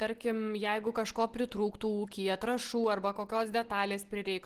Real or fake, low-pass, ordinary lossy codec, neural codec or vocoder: real; 14.4 kHz; Opus, 16 kbps; none